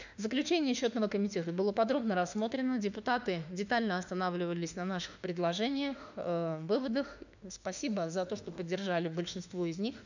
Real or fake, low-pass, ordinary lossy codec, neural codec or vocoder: fake; 7.2 kHz; none; autoencoder, 48 kHz, 32 numbers a frame, DAC-VAE, trained on Japanese speech